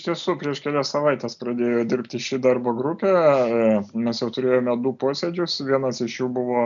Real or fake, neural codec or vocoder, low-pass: real; none; 7.2 kHz